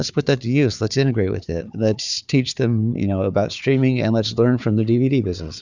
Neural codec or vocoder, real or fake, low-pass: codec, 16 kHz, 4 kbps, FunCodec, trained on Chinese and English, 50 frames a second; fake; 7.2 kHz